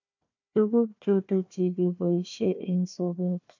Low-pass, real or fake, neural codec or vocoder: 7.2 kHz; fake; codec, 16 kHz, 1 kbps, FunCodec, trained on Chinese and English, 50 frames a second